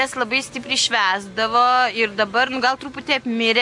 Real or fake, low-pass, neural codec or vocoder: real; 10.8 kHz; none